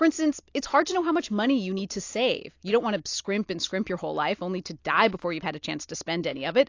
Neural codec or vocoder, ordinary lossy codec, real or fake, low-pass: none; AAC, 48 kbps; real; 7.2 kHz